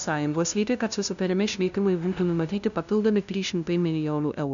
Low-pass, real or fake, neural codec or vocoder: 7.2 kHz; fake; codec, 16 kHz, 0.5 kbps, FunCodec, trained on LibriTTS, 25 frames a second